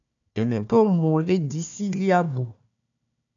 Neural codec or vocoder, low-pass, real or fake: codec, 16 kHz, 1 kbps, FunCodec, trained on Chinese and English, 50 frames a second; 7.2 kHz; fake